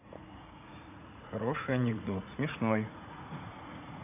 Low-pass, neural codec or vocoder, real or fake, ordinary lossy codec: 3.6 kHz; vocoder, 44.1 kHz, 80 mel bands, Vocos; fake; none